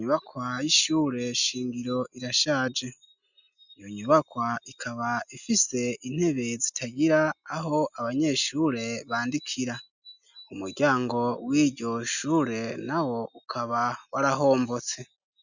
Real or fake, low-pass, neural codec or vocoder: real; 7.2 kHz; none